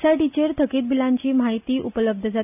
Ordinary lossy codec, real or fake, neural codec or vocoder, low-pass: none; real; none; 3.6 kHz